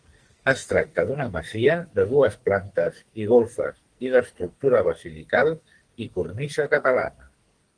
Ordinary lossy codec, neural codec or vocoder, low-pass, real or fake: Opus, 24 kbps; codec, 44.1 kHz, 2.6 kbps, SNAC; 9.9 kHz; fake